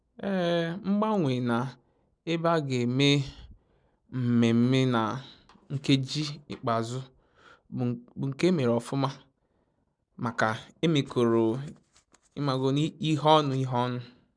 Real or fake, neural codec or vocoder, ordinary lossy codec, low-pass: real; none; none; 9.9 kHz